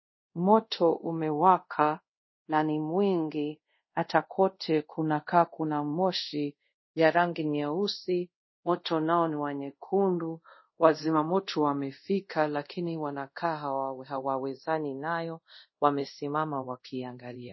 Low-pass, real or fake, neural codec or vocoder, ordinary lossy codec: 7.2 kHz; fake; codec, 24 kHz, 0.5 kbps, DualCodec; MP3, 24 kbps